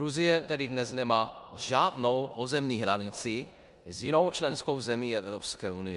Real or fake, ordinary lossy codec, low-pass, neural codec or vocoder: fake; Opus, 64 kbps; 10.8 kHz; codec, 16 kHz in and 24 kHz out, 0.9 kbps, LongCat-Audio-Codec, four codebook decoder